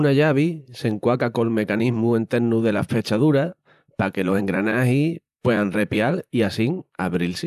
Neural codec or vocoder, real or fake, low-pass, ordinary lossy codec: vocoder, 44.1 kHz, 128 mel bands, Pupu-Vocoder; fake; 14.4 kHz; none